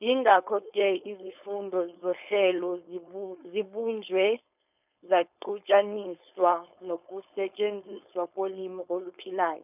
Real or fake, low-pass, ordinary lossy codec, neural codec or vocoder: fake; 3.6 kHz; none; codec, 16 kHz, 4.8 kbps, FACodec